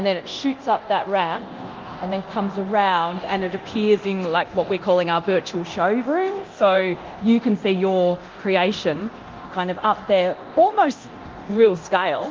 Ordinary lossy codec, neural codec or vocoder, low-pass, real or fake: Opus, 24 kbps; codec, 24 kHz, 0.9 kbps, DualCodec; 7.2 kHz; fake